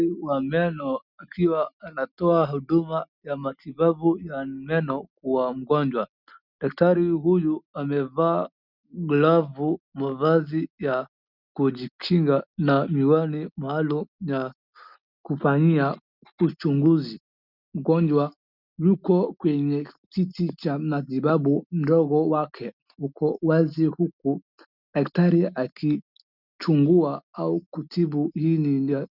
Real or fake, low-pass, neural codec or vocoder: real; 5.4 kHz; none